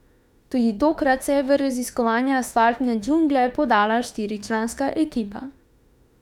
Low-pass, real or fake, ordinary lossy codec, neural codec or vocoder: 19.8 kHz; fake; none; autoencoder, 48 kHz, 32 numbers a frame, DAC-VAE, trained on Japanese speech